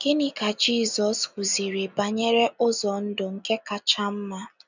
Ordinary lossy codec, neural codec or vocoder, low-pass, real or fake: none; none; 7.2 kHz; real